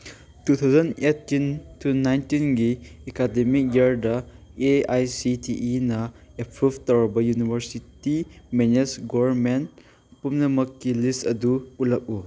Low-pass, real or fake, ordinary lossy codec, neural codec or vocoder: none; real; none; none